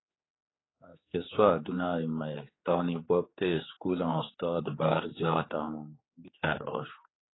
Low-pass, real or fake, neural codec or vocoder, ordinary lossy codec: 7.2 kHz; fake; codec, 16 kHz, 4 kbps, X-Codec, HuBERT features, trained on general audio; AAC, 16 kbps